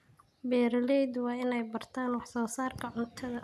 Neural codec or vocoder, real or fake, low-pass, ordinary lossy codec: none; real; 14.4 kHz; none